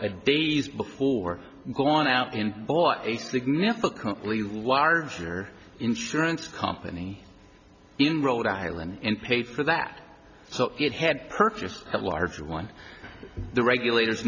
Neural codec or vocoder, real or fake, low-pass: none; real; 7.2 kHz